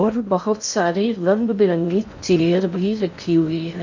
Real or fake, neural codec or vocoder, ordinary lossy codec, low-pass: fake; codec, 16 kHz in and 24 kHz out, 0.6 kbps, FocalCodec, streaming, 2048 codes; none; 7.2 kHz